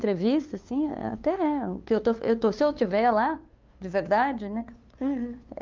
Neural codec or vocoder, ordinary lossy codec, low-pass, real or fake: codec, 16 kHz, 2 kbps, FunCodec, trained on LibriTTS, 25 frames a second; Opus, 24 kbps; 7.2 kHz; fake